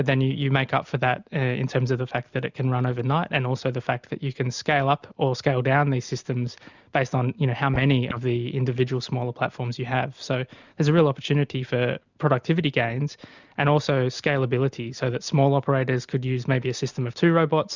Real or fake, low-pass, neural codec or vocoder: real; 7.2 kHz; none